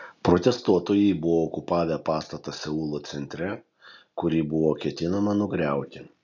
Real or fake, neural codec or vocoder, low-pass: real; none; 7.2 kHz